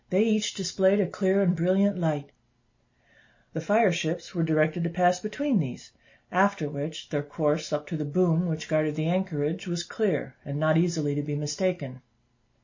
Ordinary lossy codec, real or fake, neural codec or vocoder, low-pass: MP3, 32 kbps; real; none; 7.2 kHz